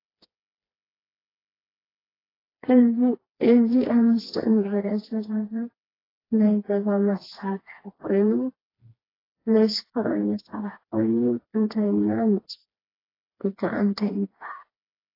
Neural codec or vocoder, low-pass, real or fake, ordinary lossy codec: codec, 16 kHz, 2 kbps, FreqCodec, smaller model; 5.4 kHz; fake; AAC, 24 kbps